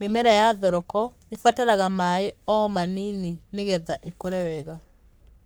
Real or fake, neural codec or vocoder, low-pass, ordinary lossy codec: fake; codec, 44.1 kHz, 3.4 kbps, Pupu-Codec; none; none